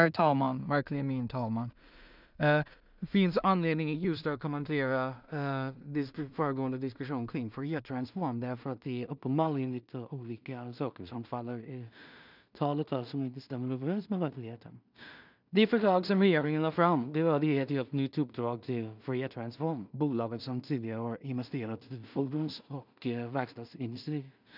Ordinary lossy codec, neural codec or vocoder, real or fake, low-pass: none; codec, 16 kHz in and 24 kHz out, 0.4 kbps, LongCat-Audio-Codec, two codebook decoder; fake; 5.4 kHz